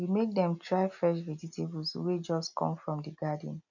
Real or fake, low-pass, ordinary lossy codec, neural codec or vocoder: real; 7.2 kHz; none; none